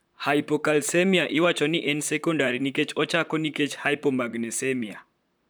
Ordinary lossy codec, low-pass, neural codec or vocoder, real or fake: none; none; vocoder, 44.1 kHz, 128 mel bands, Pupu-Vocoder; fake